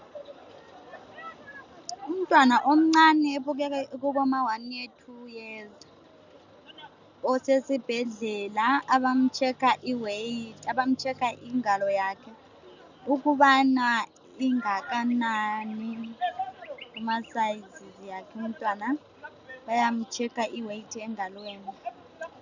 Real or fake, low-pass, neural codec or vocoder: real; 7.2 kHz; none